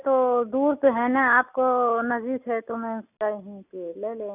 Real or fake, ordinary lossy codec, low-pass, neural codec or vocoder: real; none; 3.6 kHz; none